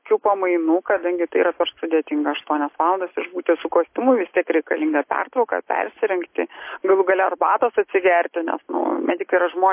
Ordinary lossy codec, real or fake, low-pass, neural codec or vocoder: MP3, 24 kbps; real; 3.6 kHz; none